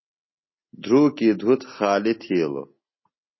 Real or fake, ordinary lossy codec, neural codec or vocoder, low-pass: real; MP3, 24 kbps; none; 7.2 kHz